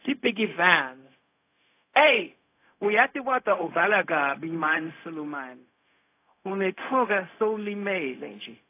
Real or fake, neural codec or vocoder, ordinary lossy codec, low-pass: fake; codec, 16 kHz, 0.4 kbps, LongCat-Audio-Codec; AAC, 24 kbps; 3.6 kHz